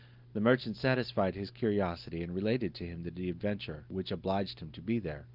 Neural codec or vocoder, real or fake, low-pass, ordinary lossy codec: none; real; 5.4 kHz; Opus, 32 kbps